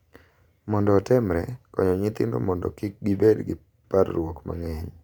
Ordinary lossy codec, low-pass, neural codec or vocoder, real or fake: none; 19.8 kHz; vocoder, 44.1 kHz, 128 mel bands every 512 samples, BigVGAN v2; fake